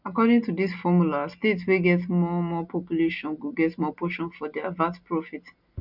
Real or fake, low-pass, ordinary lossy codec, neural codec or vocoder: real; 5.4 kHz; none; none